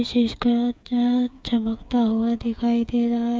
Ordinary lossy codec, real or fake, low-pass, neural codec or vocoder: none; fake; none; codec, 16 kHz, 8 kbps, FreqCodec, smaller model